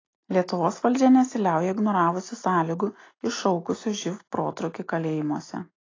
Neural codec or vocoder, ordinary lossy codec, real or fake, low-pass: none; AAC, 32 kbps; real; 7.2 kHz